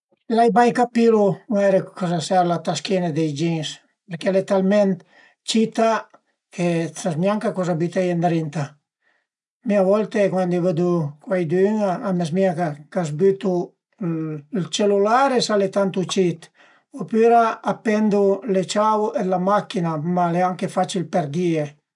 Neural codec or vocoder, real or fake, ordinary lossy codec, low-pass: none; real; none; 10.8 kHz